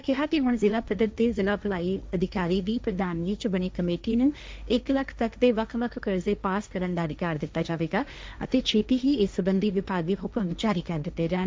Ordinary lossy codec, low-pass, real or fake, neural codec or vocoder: none; none; fake; codec, 16 kHz, 1.1 kbps, Voila-Tokenizer